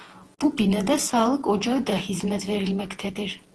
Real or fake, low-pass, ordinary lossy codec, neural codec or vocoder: fake; 10.8 kHz; Opus, 16 kbps; vocoder, 48 kHz, 128 mel bands, Vocos